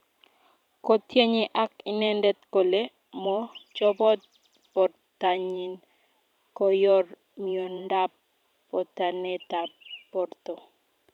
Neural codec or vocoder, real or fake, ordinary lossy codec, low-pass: vocoder, 44.1 kHz, 128 mel bands every 512 samples, BigVGAN v2; fake; none; 19.8 kHz